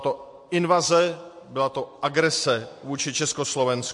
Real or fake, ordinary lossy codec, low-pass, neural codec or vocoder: real; MP3, 48 kbps; 10.8 kHz; none